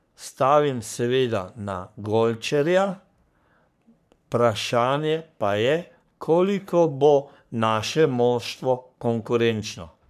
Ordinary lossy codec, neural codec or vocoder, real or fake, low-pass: none; codec, 44.1 kHz, 3.4 kbps, Pupu-Codec; fake; 14.4 kHz